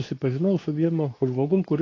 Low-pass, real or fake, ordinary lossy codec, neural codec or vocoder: 7.2 kHz; fake; AAC, 32 kbps; codec, 24 kHz, 0.9 kbps, WavTokenizer, medium speech release version 2